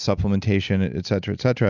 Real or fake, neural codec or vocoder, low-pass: real; none; 7.2 kHz